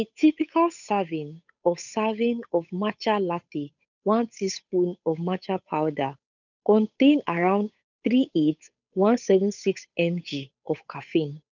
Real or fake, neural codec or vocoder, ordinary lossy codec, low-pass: fake; codec, 16 kHz, 8 kbps, FunCodec, trained on Chinese and English, 25 frames a second; none; 7.2 kHz